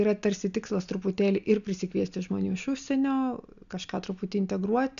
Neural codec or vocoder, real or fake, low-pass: none; real; 7.2 kHz